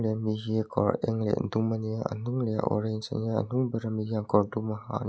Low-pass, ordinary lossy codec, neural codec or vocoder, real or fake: none; none; none; real